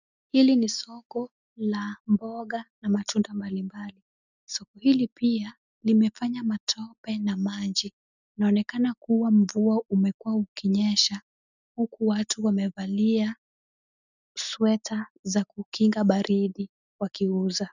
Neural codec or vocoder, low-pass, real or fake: none; 7.2 kHz; real